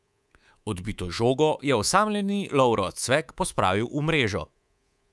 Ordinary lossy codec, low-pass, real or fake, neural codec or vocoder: none; none; fake; codec, 24 kHz, 3.1 kbps, DualCodec